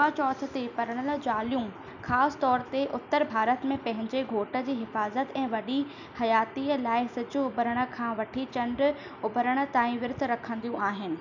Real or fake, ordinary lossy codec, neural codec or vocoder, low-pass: real; none; none; 7.2 kHz